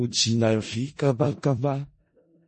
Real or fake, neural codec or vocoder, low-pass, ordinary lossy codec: fake; codec, 16 kHz in and 24 kHz out, 0.4 kbps, LongCat-Audio-Codec, four codebook decoder; 10.8 kHz; MP3, 32 kbps